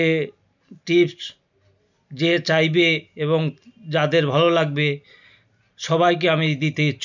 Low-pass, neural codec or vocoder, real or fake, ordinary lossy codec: 7.2 kHz; none; real; none